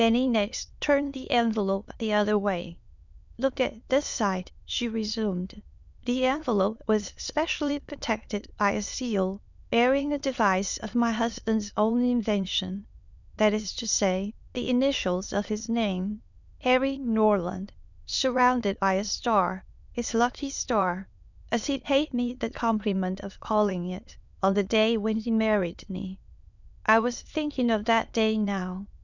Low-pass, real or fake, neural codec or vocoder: 7.2 kHz; fake; autoencoder, 22.05 kHz, a latent of 192 numbers a frame, VITS, trained on many speakers